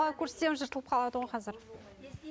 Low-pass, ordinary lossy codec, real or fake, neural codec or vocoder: none; none; real; none